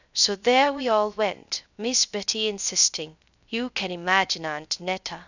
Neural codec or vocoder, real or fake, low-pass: codec, 16 kHz, 0.3 kbps, FocalCodec; fake; 7.2 kHz